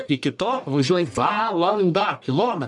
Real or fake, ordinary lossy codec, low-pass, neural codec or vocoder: fake; MP3, 96 kbps; 10.8 kHz; codec, 44.1 kHz, 1.7 kbps, Pupu-Codec